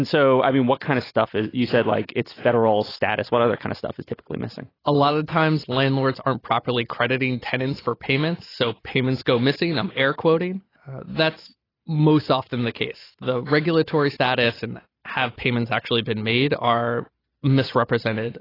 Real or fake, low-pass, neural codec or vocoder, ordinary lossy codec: real; 5.4 kHz; none; AAC, 24 kbps